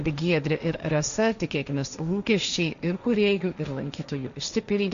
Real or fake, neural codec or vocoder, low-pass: fake; codec, 16 kHz, 1.1 kbps, Voila-Tokenizer; 7.2 kHz